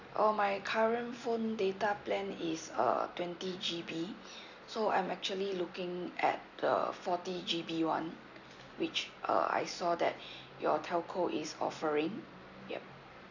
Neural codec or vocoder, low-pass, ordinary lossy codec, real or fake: none; 7.2 kHz; none; real